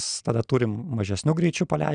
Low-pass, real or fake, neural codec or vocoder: 9.9 kHz; real; none